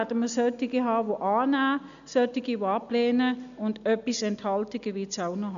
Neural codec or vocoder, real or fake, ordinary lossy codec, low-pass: none; real; none; 7.2 kHz